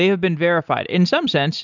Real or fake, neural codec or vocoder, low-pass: real; none; 7.2 kHz